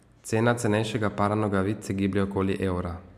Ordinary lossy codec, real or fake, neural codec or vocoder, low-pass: AAC, 96 kbps; real; none; 14.4 kHz